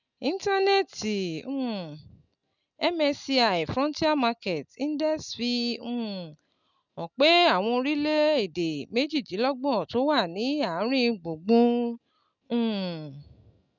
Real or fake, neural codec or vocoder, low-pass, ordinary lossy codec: real; none; 7.2 kHz; none